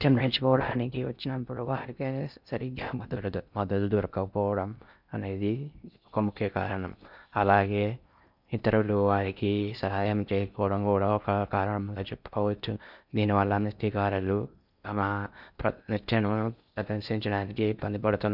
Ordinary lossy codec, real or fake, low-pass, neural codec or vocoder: none; fake; 5.4 kHz; codec, 16 kHz in and 24 kHz out, 0.6 kbps, FocalCodec, streaming, 4096 codes